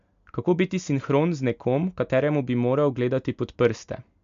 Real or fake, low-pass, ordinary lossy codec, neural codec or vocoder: real; 7.2 kHz; MP3, 64 kbps; none